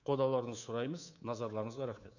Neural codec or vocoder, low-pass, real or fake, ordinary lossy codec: codec, 24 kHz, 3.1 kbps, DualCodec; 7.2 kHz; fake; none